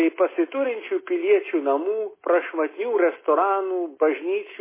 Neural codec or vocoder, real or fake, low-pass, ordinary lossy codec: none; real; 3.6 kHz; MP3, 16 kbps